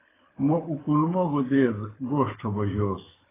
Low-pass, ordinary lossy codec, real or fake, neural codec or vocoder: 3.6 kHz; AAC, 16 kbps; fake; codec, 24 kHz, 6 kbps, HILCodec